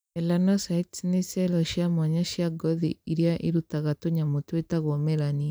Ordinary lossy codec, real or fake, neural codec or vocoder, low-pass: none; fake; vocoder, 44.1 kHz, 128 mel bands every 512 samples, BigVGAN v2; none